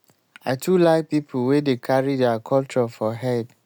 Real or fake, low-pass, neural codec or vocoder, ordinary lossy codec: real; none; none; none